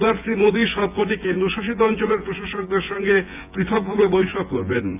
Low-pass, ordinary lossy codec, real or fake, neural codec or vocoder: 3.6 kHz; MP3, 32 kbps; fake; vocoder, 24 kHz, 100 mel bands, Vocos